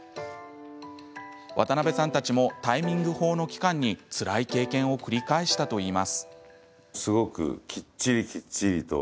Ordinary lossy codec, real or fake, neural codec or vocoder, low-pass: none; real; none; none